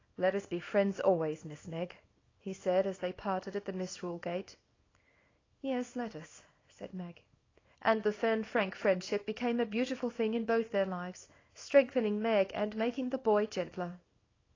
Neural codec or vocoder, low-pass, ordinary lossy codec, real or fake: codec, 24 kHz, 0.9 kbps, WavTokenizer, small release; 7.2 kHz; AAC, 32 kbps; fake